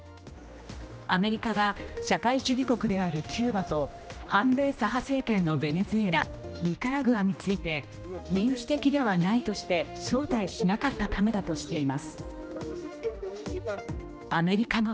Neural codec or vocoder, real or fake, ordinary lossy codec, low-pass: codec, 16 kHz, 1 kbps, X-Codec, HuBERT features, trained on general audio; fake; none; none